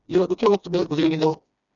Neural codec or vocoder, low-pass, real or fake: codec, 16 kHz, 2 kbps, FreqCodec, smaller model; 7.2 kHz; fake